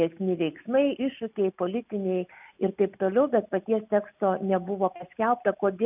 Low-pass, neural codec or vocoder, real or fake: 3.6 kHz; none; real